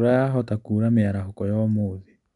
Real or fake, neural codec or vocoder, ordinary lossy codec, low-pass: real; none; none; 10.8 kHz